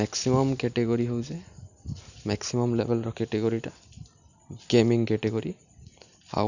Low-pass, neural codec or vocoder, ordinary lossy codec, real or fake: 7.2 kHz; none; none; real